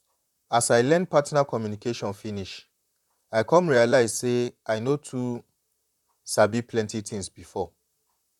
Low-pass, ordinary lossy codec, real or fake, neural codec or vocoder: 19.8 kHz; none; fake; vocoder, 44.1 kHz, 128 mel bands, Pupu-Vocoder